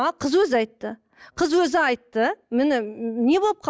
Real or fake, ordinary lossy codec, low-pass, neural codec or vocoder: real; none; none; none